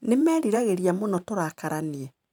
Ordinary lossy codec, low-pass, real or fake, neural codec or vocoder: none; 19.8 kHz; fake; vocoder, 44.1 kHz, 128 mel bands every 512 samples, BigVGAN v2